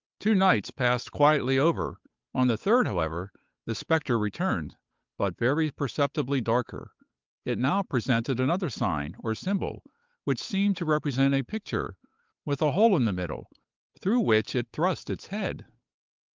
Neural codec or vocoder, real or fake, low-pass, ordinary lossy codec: codec, 16 kHz, 8 kbps, FunCodec, trained on Chinese and English, 25 frames a second; fake; 7.2 kHz; Opus, 32 kbps